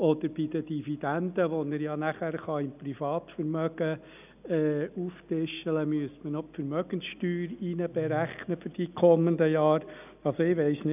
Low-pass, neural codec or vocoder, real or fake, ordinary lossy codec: 3.6 kHz; none; real; none